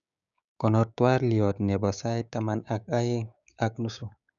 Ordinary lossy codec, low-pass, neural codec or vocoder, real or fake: none; 7.2 kHz; codec, 16 kHz, 6 kbps, DAC; fake